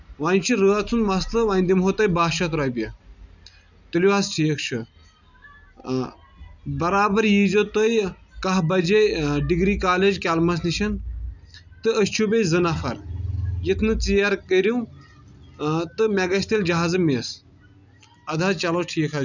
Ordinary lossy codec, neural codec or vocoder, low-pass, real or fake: none; none; 7.2 kHz; real